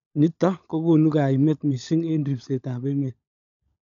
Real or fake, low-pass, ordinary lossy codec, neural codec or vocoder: fake; 7.2 kHz; none; codec, 16 kHz, 16 kbps, FunCodec, trained on LibriTTS, 50 frames a second